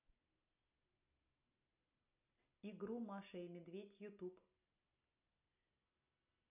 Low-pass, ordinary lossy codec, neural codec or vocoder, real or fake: 3.6 kHz; none; none; real